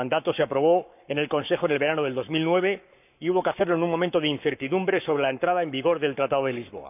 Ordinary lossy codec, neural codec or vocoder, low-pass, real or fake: none; codec, 44.1 kHz, 7.8 kbps, Pupu-Codec; 3.6 kHz; fake